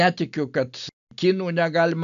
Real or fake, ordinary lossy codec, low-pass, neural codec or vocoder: real; AAC, 96 kbps; 7.2 kHz; none